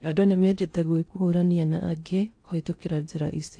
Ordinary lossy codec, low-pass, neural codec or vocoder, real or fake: AAC, 48 kbps; 9.9 kHz; codec, 16 kHz in and 24 kHz out, 0.6 kbps, FocalCodec, streaming, 2048 codes; fake